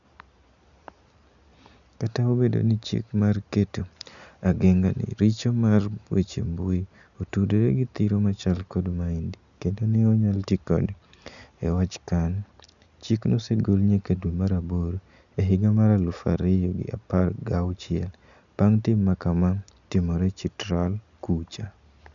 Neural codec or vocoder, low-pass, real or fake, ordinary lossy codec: none; 7.2 kHz; real; none